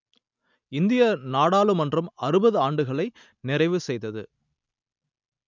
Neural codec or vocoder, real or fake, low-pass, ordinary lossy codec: none; real; 7.2 kHz; none